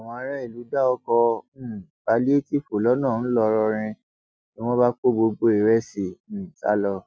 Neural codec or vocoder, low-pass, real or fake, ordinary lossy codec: none; none; real; none